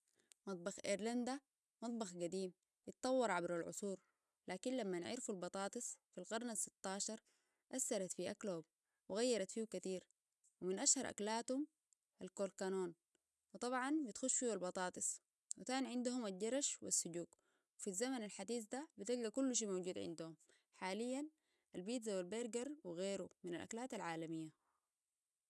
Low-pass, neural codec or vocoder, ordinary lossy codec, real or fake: none; none; none; real